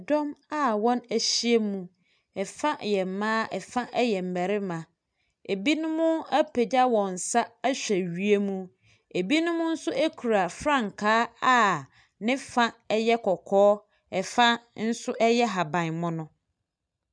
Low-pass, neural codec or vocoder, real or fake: 9.9 kHz; none; real